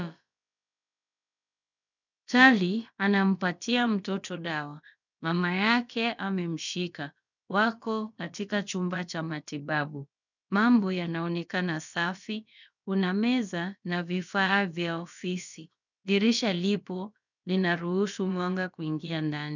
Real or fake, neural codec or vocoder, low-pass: fake; codec, 16 kHz, about 1 kbps, DyCAST, with the encoder's durations; 7.2 kHz